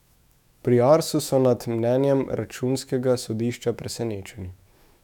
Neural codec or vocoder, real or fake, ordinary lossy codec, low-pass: autoencoder, 48 kHz, 128 numbers a frame, DAC-VAE, trained on Japanese speech; fake; none; 19.8 kHz